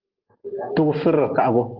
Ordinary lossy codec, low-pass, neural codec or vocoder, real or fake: Opus, 16 kbps; 5.4 kHz; none; real